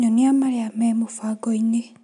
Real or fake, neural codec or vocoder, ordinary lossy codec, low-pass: real; none; none; 10.8 kHz